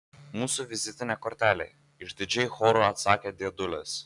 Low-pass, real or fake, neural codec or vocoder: 10.8 kHz; real; none